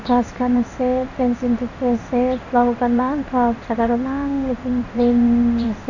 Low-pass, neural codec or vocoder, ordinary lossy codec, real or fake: 7.2 kHz; codec, 16 kHz in and 24 kHz out, 1 kbps, XY-Tokenizer; none; fake